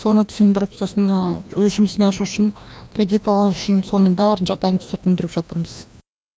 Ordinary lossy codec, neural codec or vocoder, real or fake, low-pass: none; codec, 16 kHz, 1 kbps, FreqCodec, larger model; fake; none